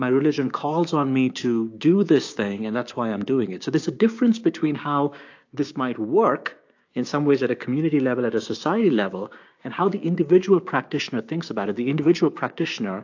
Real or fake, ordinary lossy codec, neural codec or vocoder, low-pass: fake; AAC, 48 kbps; codec, 16 kHz, 6 kbps, DAC; 7.2 kHz